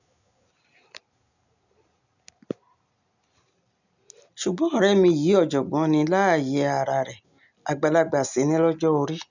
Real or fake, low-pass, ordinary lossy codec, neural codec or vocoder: real; 7.2 kHz; none; none